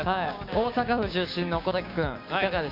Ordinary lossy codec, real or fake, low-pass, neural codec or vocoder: none; fake; 5.4 kHz; codec, 16 kHz, 6 kbps, DAC